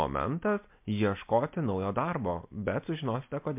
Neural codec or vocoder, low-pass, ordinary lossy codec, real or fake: none; 3.6 kHz; MP3, 32 kbps; real